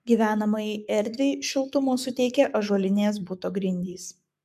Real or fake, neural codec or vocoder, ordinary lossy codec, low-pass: fake; codec, 44.1 kHz, 7.8 kbps, Pupu-Codec; MP3, 96 kbps; 14.4 kHz